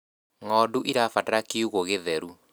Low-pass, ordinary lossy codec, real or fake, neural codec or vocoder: none; none; real; none